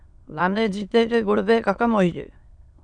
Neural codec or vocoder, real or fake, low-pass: autoencoder, 22.05 kHz, a latent of 192 numbers a frame, VITS, trained on many speakers; fake; 9.9 kHz